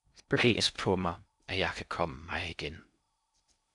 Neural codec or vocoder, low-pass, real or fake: codec, 16 kHz in and 24 kHz out, 0.6 kbps, FocalCodec, streaming, 4096 codes; 10.8 kHz; fake